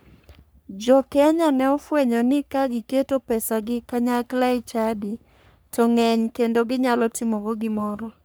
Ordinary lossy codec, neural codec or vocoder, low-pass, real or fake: none; codec, 44.1 kHz, 3.4 kbps, Pupu-Codec; none; fake